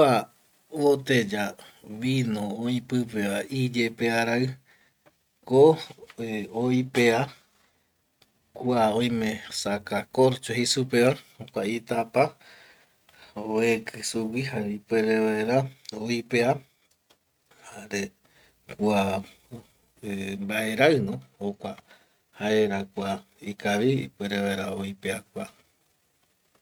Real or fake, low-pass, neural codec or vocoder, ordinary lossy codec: real; 19.8 kHz; none; none